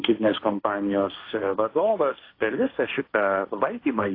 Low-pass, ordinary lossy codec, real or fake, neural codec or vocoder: 5.4 kHz; AAC, 32 kbps; fake; codec, 16 kHz, 1.1 kbps, Voila-Tokenizer